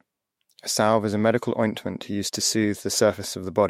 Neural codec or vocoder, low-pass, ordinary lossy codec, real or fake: autoencoder, 48 kHz, 128 numbers a frame, DAC-VAE, trained on Japanese speech; 19.8 kHz; MP3, 64 kbps; fake